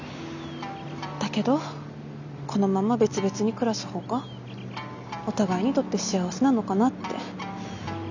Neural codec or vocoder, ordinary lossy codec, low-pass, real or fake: none; none; 7.2 kHz; real